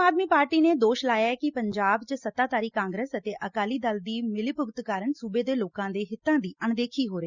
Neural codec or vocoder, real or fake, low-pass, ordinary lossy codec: none; real; 7.2 kHz; Opus, 64 kbps